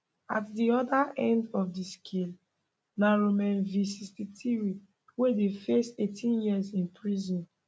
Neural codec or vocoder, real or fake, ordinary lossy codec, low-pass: none; real; none; none